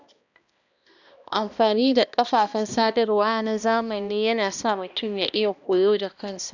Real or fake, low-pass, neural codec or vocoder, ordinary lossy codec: fake; 7.2 kHz; codec, 16 kHz, 1 kbps, X-Codec, HuBERT features, trained on balanced general audio; none